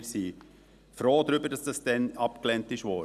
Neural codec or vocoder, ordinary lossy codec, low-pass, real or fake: none; none; 14.4 kHz; real